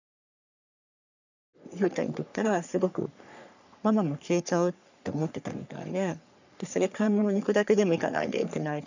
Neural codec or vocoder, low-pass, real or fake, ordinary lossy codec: codec, 44.1 kHz, 3.4 kbps, Pupu-Codec; 7.2 kHz; fake; none